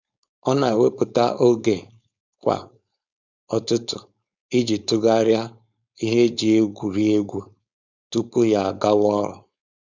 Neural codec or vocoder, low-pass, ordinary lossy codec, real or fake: codec, 16 kHz, 4.8 kbps, FACodec; 7.2 kHz; none; fake